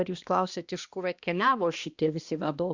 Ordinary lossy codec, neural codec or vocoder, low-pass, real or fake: Opus, 64 kbps; codec, 16 kHz, 1 kbps, X-Codec, HuBERT features, trained on balanced general audio; 7.2 kHz; fake